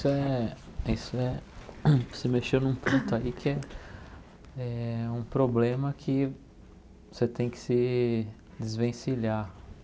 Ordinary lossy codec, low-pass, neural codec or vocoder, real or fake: none; none; none; real